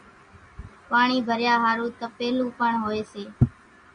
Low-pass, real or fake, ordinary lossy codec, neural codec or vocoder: 9.9 kHz; real; Opus, 64 kbps; none